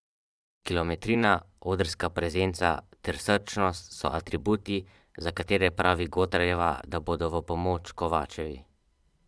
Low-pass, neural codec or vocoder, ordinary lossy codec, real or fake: none; vocoder, 22.05 kHz, 80 mel bands, Vocos; none; fake